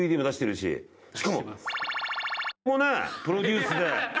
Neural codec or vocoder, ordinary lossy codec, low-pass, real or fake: none; none; none; real